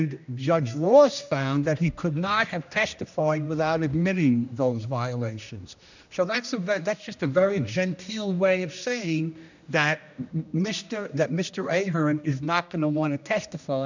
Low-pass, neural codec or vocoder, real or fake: 7.2 kHz; codec, 16 kHz, 1 kbps, X-Codec, HuBERT features, trained on general audio; fake